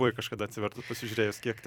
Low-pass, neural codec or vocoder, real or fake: 19.8 kHz; vocoder, 44.1 kHz, 128 mel bands every 256 samples, BigVGAN v2; fake